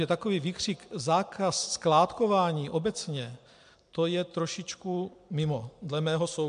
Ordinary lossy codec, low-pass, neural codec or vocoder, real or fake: MP3, 64 kbps; 9.9 kHz; none; real